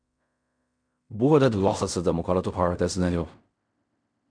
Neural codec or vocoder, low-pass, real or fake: codec, 16 kHz in and 24 kHz out, 0.4 kbps, LongCat-Audio-Codec, fine tuned four codebook decoder; 9.9 kHz; fake